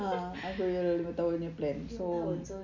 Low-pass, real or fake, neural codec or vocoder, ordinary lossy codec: 7.2 kHz; real; none; none